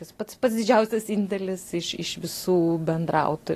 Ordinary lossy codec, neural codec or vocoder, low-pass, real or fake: AAC, 48 kbps; none; 14.4 kHz; real